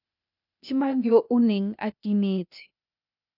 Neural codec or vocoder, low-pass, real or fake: codec, 16 kHz, 0.8 kbps, ZipCodec; 5.4 kHz; fake